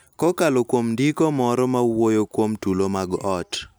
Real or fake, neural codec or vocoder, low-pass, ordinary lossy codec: real; none; none; none